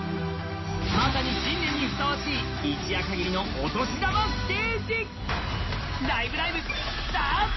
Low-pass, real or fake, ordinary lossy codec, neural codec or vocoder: 7.2 kHz; real; MP3, 24 kbps; none